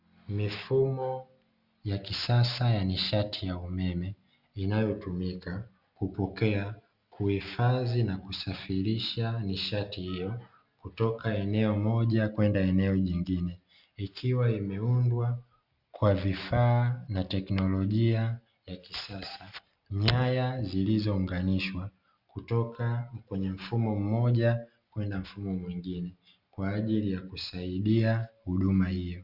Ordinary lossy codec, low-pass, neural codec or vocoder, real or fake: Opus, 64 kbps; 5.4 kHz; none; real